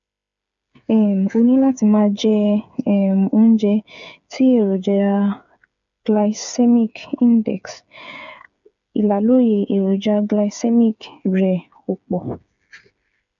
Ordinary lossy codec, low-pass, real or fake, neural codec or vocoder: none; 7.2 kHz; fake; codec, 16 kHz, 8 kbps, FreqCodec, smaller model